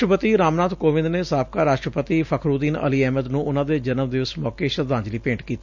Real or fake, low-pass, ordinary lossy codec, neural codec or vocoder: real; 7.2 kHz; none; none